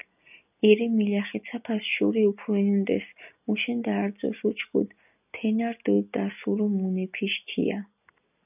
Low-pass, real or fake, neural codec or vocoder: 3.6 kHz; real; none